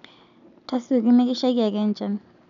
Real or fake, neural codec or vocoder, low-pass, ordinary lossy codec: real; none; 7.2 kHz; none